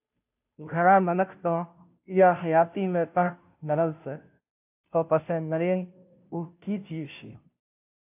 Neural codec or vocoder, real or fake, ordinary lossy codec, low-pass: codec, 16 kHz, 0.5 kbps, FunCodec, trained on Chinese and English, 25 frames a second; fake; AAC, 32 kbps; 3.6 kHz